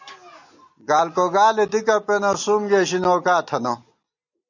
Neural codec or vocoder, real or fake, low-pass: none; real; 7.2 kHz